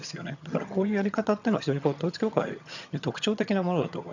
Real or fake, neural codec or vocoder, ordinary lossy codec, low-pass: fake; vocoder, 22.05 kHz, 80 mel bands, HiFi-GAN; none; 7.2 kHz